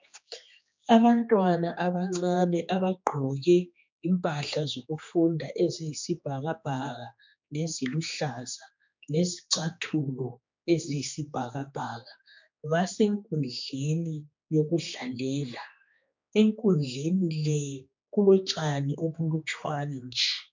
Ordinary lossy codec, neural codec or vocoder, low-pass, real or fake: MP3, 64 kbps; codec, 16 kHz, 2 kbps, X-Codec, HuBERT features, trained on general audio; 7.2 kHz; fake